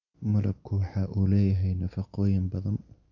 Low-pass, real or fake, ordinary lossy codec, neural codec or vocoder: 7.2 kHz; real; none; none